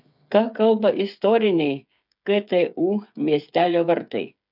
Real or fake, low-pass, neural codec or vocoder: fake; 5.4 kHz; codec, 16 kHz, 8 kbps, FreqCodec, smaller model